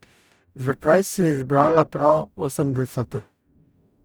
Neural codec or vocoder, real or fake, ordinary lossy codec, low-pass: codec, 44.1 kHz, 0.9 kbps, DAC; fake; none; none